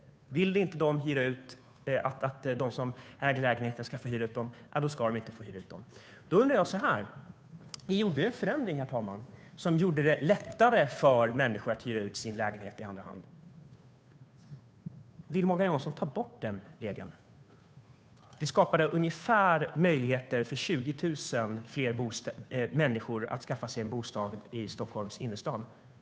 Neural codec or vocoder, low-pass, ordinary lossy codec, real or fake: codec, 16 kHz, 2 kbps, FunCodec, trained on Chinese and English, 25 frames a second; none; none; fake